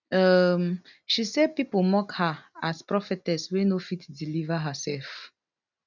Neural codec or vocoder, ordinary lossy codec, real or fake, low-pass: none; none; real; 7.2 kHz